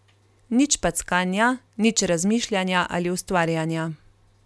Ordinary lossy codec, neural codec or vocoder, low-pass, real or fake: none; none; none; real